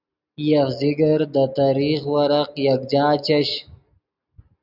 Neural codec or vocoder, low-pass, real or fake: none; 5.4 kHz; real